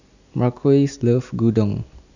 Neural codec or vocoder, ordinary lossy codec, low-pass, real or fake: none; none; 7.2 kHz; real